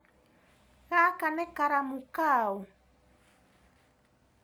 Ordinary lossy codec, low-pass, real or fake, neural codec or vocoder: none; none; real; none